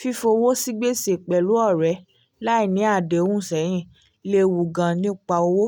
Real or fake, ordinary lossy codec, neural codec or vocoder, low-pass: real; none; none; none